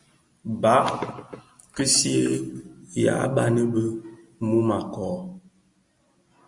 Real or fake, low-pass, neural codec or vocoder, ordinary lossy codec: real; 10.8 kHz; none; Opus, 64 kbps